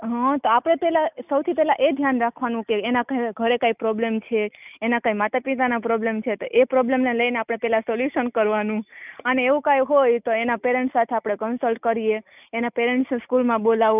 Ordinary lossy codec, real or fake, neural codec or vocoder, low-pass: none; real; none; 3.6 kHz